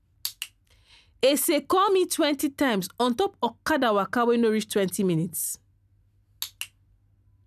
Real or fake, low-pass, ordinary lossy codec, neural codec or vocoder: real; 14.4 kHz; none; none